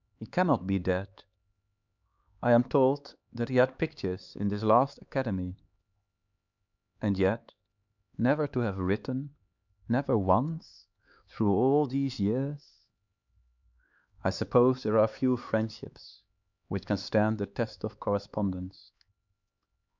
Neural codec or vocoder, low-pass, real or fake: codec, 16 kHz, 4 kbps, X-Codec, HuBERT features, trained on LibriSpeech; 7.2 kHz; fake